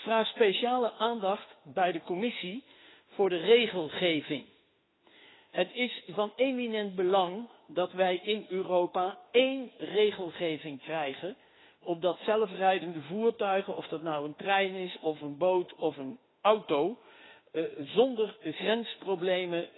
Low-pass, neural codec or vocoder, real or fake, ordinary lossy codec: 7.2 kHz; autoencoder, 48 kHz, 32 numbers a frame, DAC-VAE, trained on Japanese speech; fake; AAC, 16 kbps